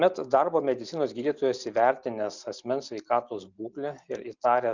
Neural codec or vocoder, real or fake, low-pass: none; real; 7.2 kHz